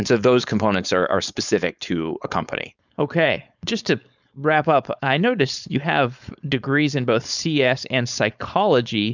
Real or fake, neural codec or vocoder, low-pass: fake; codec, 16 kHz, 4.8 kbps, FACodec; 7.2 kHz